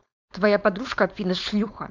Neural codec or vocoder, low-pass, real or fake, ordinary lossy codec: codec, 16 kHz, 4.8 kbps, FACodec; 7.2 kHz; fake; none